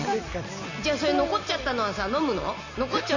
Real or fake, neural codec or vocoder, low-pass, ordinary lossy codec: real; none; 7.2 kHz; none